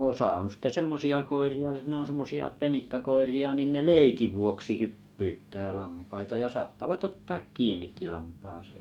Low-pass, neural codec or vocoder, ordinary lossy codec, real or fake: 19.8 kHz; codec, 44.1 kHz, 2.6 kbps, DAC; none; fake